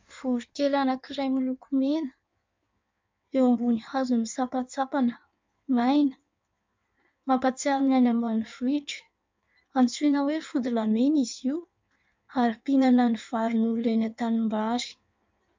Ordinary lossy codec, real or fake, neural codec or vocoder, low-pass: MP3, 64 kbps; fake; codec, 16 kHz in and 24 kHz out, 1.1 kbps, FireRedTTS-2 codec; 7.2 kHz